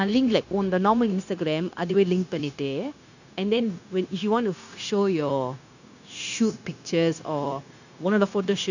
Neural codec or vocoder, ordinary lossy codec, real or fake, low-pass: codec, 16 kHz, 0.9 kbps, LongCat-Audio-Codec; none; fake; 7.2 kHz